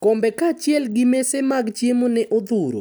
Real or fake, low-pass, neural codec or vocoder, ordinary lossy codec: real; none; none; none